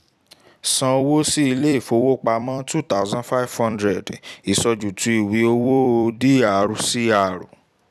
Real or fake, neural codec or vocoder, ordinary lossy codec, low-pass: fake; vocoder, 44.1 kHz, 128 mel bands every 256 samples, BigVGAN v2; none; 14.4 kHz